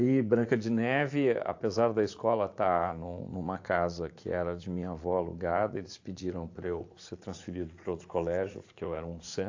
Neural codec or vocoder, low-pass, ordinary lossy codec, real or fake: vocoder, 44.1 kHz, 80 mel bands, Vocos; 7.2 kHz; AAC, 48 kbps; fake